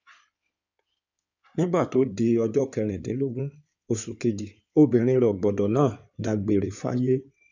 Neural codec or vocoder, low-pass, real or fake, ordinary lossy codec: codec, 16 kHz in and 24 kHz out, 2.2 kbps, FireRedTTS-2 codec; 7.2 kHz; fake; none